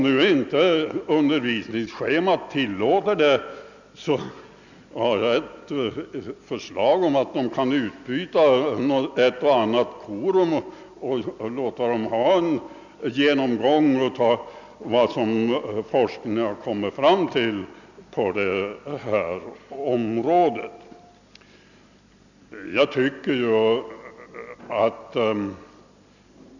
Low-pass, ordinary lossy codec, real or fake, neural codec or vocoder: 7.2 kHz; none; real; none